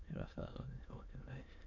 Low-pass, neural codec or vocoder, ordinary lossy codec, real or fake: 7.2 kHz; autoencoder, 22.05 kHz, a latent of 192 numbers a frame, VITS, trained on many speakers; MP3, 48 kbps; fake